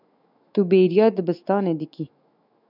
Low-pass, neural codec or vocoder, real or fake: 5.4 kHz; autoencoder, 48 kHz, 128 numbers a frame, DAC-VAE, trained on Japanese speech; fake